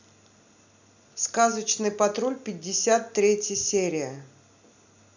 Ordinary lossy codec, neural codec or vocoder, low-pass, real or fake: none; none; 7.2 kHz; real